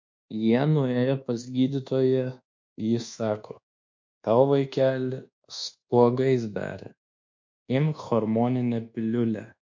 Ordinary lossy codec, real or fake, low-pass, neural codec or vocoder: MP3, 48 kbps; fake; 7.2 kHz; codec, 24 kHz, 1.2 kbps, DualCodec